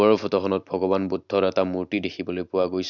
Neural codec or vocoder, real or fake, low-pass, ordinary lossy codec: none; real; 7.2 kHz; none